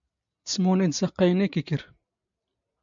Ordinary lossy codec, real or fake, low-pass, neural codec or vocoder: MP3, 96 kbps; real; 7.2 kHz; none